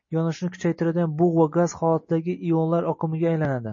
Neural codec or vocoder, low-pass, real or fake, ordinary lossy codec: none; 7.2 kHz; real; MP3, 32 kbps